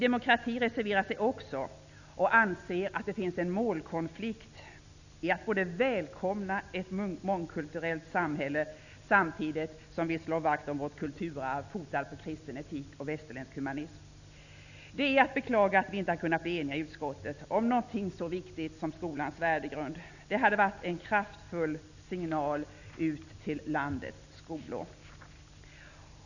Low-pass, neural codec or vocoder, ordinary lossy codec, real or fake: 7.2 kHz; none; none; real